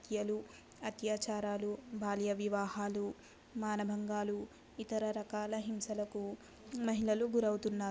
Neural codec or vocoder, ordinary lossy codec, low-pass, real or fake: none; none; none; real